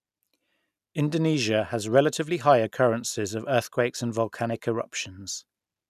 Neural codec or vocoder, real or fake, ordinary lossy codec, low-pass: none; real; none; 14.4 kHz